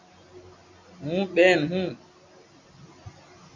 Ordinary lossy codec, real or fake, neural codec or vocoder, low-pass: MP3, 48 kbps; real; none; 7.2 kHz